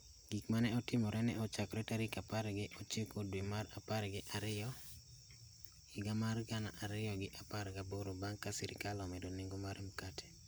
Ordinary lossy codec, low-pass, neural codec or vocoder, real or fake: none; none; none; real